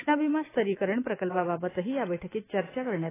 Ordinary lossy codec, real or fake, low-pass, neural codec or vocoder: AAC, 16 kbps; fake; 3.6 kHz; autoencoder, 48 kHz, 128 numbers a frame, DAC-VAE, trained on Japanese speech